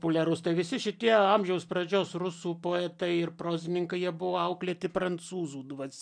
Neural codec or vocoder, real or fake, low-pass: none; real; 9.9 kHz